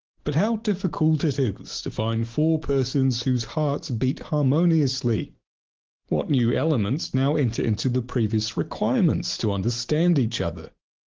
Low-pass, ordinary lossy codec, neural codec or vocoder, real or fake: 7.2 kHz; Opus, 16 kbps; none; real